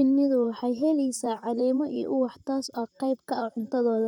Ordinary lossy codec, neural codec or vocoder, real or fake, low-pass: none; vocoder, 44.1 kHz, 128 mel bands, Pupu-Vocoder; fake; 19.8 kHz